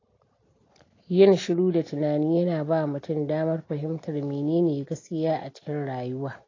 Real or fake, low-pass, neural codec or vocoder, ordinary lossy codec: real; 7.2 kHz; none; AAC, 32 kbps